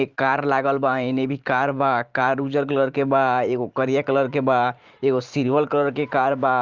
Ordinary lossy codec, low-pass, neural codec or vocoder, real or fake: Opus, 32 kbps; 7.2 kHz; vocoder, 44.1 kHz, 128 mel bands every 512 samples, BigVGAN v2; fake